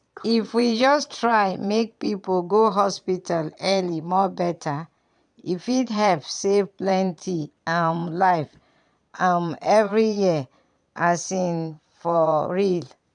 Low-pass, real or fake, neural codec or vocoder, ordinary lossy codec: 9.9 kHz; fake; vocoder, 22.05 kHz, 80 mel bands, Vocos; none